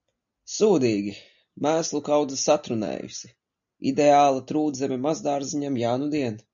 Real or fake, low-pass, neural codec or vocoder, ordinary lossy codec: real; 7.2 kHz; none; MP3, 64 kbps